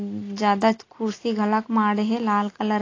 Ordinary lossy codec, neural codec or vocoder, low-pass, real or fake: AAC, 32 kbps; none; 7.2 kHz; real